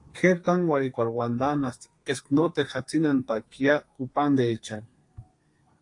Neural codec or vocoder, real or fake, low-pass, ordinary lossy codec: codec, 32 kHz, 1.9 kbps, SNAC; fake; 10.8 kHz; AAC, 48 kbps